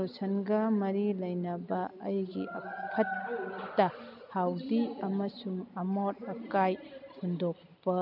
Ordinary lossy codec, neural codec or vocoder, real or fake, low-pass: none; none; real; 5.4 kHz